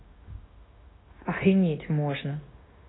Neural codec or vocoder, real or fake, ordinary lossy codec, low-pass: codec, 16 kHz, 0.9 kbps, LongCat-Audio-Codec; fake; AAC, 16 kbps; 7.2 kHz